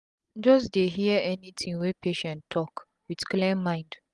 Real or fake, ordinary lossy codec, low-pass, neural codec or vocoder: real; Opus, 24 kbps; 10.8 kHz; none